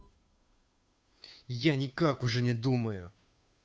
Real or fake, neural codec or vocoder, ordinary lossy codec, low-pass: fake; codec, 16 kHz, 2 kbps, FunCodec, trained on Chinese and English, 25 frames a second; none; none